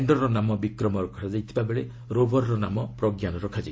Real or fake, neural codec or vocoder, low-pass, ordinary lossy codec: real; none; none; none